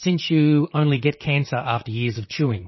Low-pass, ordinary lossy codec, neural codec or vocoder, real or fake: 7.2 kHz; MP3, 24 kbps; vocoder, 44.1 kHz, 128 mel bands, Pupu-Vocoder; fake